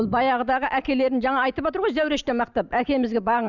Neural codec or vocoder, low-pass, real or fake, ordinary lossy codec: none; 7.2 kHz; real; none